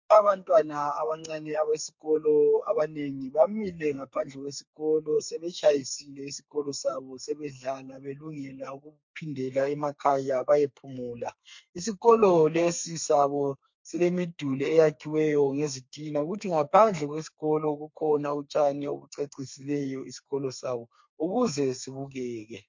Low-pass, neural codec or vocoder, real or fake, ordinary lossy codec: 7.2 kHz; codec, 32 kHz, 1.9 kbps, SNAC; fake; MP3, 48 kbps